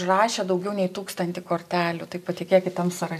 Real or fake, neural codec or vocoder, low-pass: real; none; 14.4 kHz